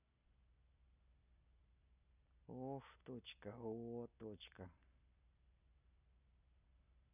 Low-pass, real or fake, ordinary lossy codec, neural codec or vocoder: 3.6 kHz; real; none; none